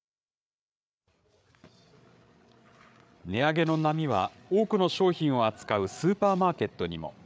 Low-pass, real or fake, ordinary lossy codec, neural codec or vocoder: none; fake; none; codec, 16 kHz, 8 kbps, FreqCodec, larger model